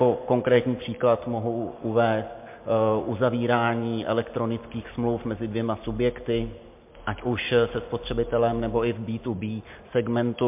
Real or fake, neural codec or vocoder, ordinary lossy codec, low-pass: real; none; MP3, 32 kbps; 3.6 kHz